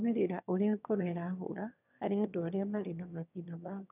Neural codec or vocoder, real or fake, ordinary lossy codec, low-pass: autoencoder, 22.05 kHz, a latent of 192 numbers a frame, VITS, trained on one speaker; fake; none; 3.6 kHz